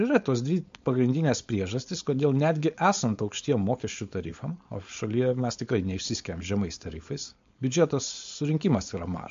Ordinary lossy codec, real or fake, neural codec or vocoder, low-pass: MP3, 48 kbps; fake; codec, 16 kHz, 4.8 kbps, FACodec; 7.2 kHz